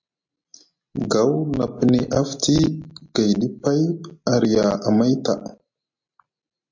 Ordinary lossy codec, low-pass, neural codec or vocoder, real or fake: MP3, 48 kbps; 7.2 kHz; none; real